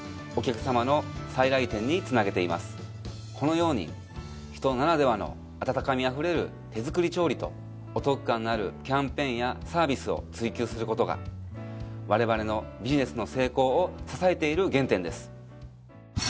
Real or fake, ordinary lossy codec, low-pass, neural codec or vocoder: real; none; none; none